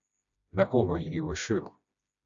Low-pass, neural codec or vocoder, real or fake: 7.2 kHz; codec, 16 kHz, 1 kbps, FreqCodec, smaller model; fake